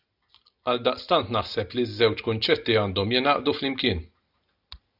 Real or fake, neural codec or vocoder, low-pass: real; none; 5.4 kHz